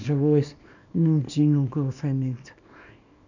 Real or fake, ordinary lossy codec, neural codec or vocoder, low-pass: fake; none; codec, 24 kHz, 0.9 kbps, WavTokenizer, small release; 7.2 kHz